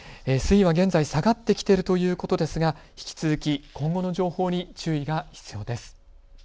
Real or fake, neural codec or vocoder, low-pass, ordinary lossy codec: real; none; none; none